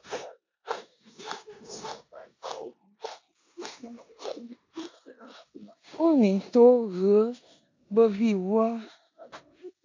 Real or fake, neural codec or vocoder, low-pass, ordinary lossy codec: fake; codec, 16 kHz in and 24 kHz out, 0.9 kbps, LongCat-Audio-Codec, four codebook decoder; 7.2 kHz; MP3, 64 kbps